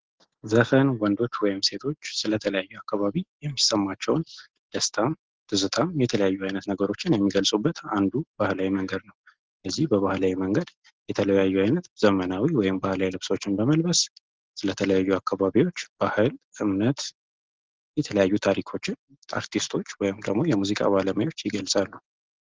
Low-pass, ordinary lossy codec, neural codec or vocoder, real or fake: 7.2 kHz; Opus, 16 kbps; none; real